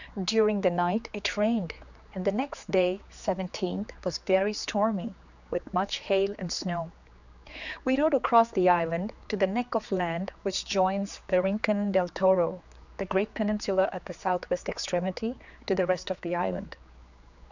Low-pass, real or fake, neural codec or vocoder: 7.2 kHz; fake; codec, 16 kHz, 4 kbps, X-Codec, HuBERT features, trained on general audio